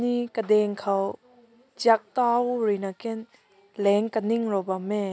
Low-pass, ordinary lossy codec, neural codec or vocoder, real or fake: none; none; none; real